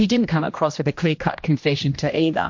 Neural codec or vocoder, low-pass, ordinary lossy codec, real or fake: codec, 16 kHz, 1 kbps, X-Codec, HuBERT features, trained on general audio; 7.2 kHz; MP3, 48 kbps; fake